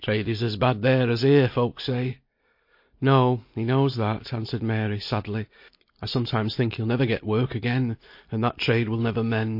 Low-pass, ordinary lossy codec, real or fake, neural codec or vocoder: 5.4 kHz; MP3, 32 kbps; real; none